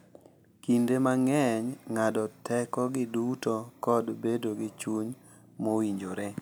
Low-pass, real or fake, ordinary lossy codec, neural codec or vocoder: none; real; none; none